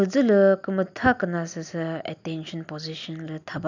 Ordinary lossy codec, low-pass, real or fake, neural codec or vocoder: none; 7.2 kHz; real; none